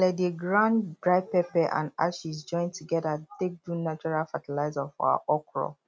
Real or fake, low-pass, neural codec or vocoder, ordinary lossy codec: real; none; none; none